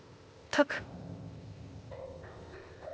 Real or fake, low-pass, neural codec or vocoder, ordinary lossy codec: fake; none; codec, 16 kHz, 0.8 kbps, ZipCodec; none